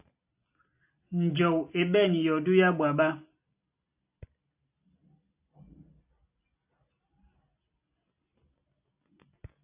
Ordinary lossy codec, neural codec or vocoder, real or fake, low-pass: AAC, 32 kbps; none; real; 3.6 kHz